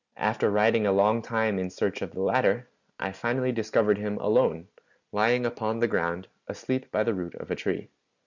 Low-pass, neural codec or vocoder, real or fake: 7.2 kHz; none; real